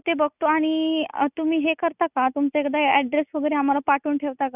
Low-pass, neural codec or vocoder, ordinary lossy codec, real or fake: 3.6 kHz; none; none; real